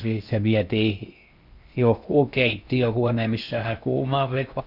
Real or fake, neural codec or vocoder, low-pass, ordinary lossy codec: fake; codec, 16 kHz in and 24 kHz out, 0.6 kbps, FocalCodec, streaming, 4096 codes; 5.4 kHz; none